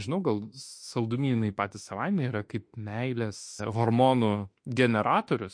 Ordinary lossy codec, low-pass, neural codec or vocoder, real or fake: MP3, 48 kbps; 9.9 kHz; autoencoder, 48 kHz, 32 numbers a frame, DAC-VAE, trained on Japanese speech; fake